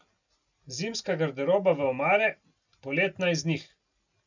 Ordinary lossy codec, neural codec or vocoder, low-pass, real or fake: none; none; 7.2 kHz; real